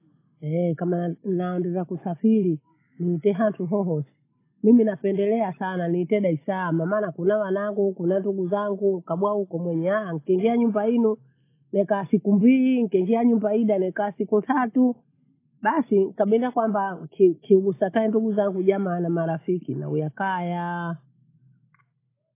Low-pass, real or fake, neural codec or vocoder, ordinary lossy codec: 3.6 kHz; real; none; AAC, 24 kbps